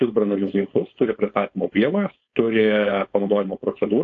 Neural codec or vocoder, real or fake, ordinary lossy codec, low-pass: codec, 16 kHz, 4.8 kbps, FACodec; fake; AAC, 48 kbps; 7.2 kHz